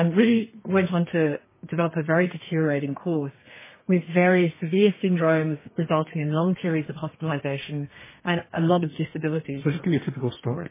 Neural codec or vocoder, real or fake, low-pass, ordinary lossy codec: codec, 32 kHz, 1.9 kbps, SNAC; fake; 3.6 kHz; MP3, 16 kbps